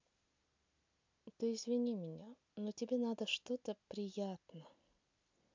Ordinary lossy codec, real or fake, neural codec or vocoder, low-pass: MP3, 64 kbps; real; none; 7.2 kHz